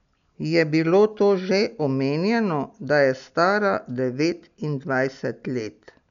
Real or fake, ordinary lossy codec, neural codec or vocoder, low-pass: real; none; none; 7.2 kHz